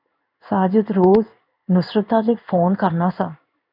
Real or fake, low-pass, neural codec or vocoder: fake; 5.4 kHz; codec, 16 kHz in and 24 kHz out, 1 kbps, XY-Tokenizer